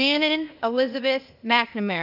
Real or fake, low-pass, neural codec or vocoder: fake; 5.4 kHz; codec, 16 kHz, 0.8 kbps, ZipCodec